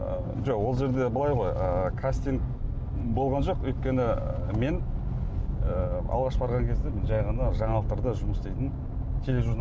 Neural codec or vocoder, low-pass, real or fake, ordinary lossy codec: none; none; real; none